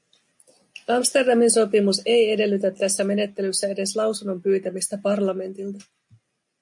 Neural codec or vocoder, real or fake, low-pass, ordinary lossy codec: none; real; 10.8 kHz; MP3, 64 kbps